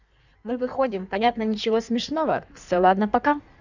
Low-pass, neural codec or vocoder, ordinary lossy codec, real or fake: 7.2 kHz; codec, 16 kHz in and 24 kHz out, 1.1 kbps, FireRedTTS-2 codec; none; fake